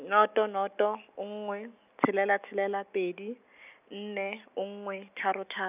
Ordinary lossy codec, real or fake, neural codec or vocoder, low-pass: none; real; none; 3.6 kHz